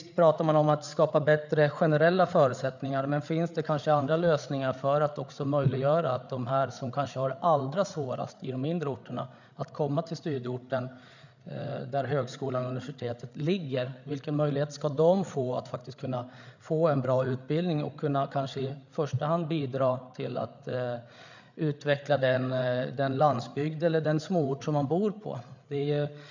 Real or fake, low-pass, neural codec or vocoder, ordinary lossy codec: fake; 7.2 kHz; codec, 16 kHz, 8 kbps, FreqCodec, larger model; none